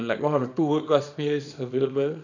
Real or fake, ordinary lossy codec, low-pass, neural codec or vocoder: fake; none; 7.2 kHz; codec, 24 kHz, 0.9 kbps, WavTokenizer, small release